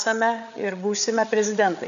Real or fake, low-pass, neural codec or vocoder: fake; 7.2 kHz; codec, 16 kHz, 8 kbps, FreqCodec, larger model